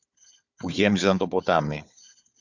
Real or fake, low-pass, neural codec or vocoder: fake; 7.2 kHz; codec, 16 kHz, 4 kbps, FunCodec, trained on Chinese and English, 50 frames a second